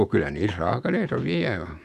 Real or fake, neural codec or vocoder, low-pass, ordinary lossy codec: fake; autoencoder, 48 kHz, 128 numbers a frame, DAC-VAE, trained on Japanese speech; 14.4 kHz; none